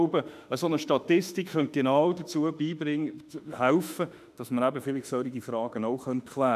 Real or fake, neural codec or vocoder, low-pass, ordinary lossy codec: fake; autoencoder, 48 kHz, 32 numbers a frame, DAC-VAE, trained on Japanese speech; 14.4 kHz; none